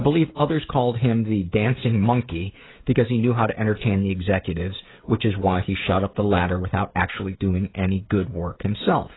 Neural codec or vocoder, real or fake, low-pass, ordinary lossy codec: codec, 16 kHz, 16 kbps, FreqCodec, smaller model; fake; 7.2 kHz; AAC, 16 kbps